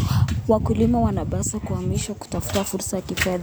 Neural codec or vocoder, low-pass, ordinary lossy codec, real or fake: none; none; none; real